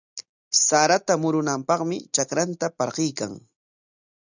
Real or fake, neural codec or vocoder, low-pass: real; none; 7.2 kHz